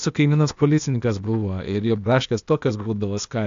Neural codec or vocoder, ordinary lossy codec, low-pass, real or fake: codec, 16 kHz, 0.8 kbps, ZipCodec; AAC, 64 kbps; 7.2 kHz; fake